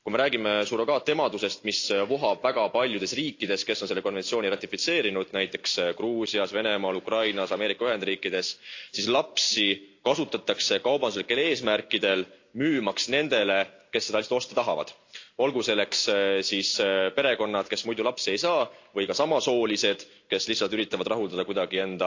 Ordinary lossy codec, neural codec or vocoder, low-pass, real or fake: AAC, 48 kbps; none; 7.2 kHz; real